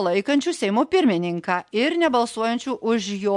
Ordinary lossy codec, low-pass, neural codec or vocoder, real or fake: MP3, 64 kbps; 10.8 kHz; none; real